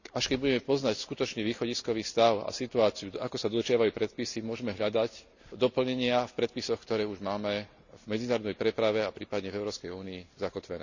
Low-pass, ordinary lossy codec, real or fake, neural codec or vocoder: 7.2 kHz; none; real; none